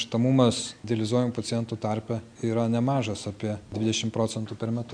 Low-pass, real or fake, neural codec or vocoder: 9.9 kHz; real; none